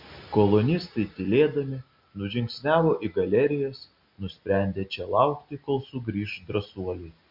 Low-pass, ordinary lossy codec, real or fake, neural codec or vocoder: 5.4 kHz; MP3, 48 kbps; real; none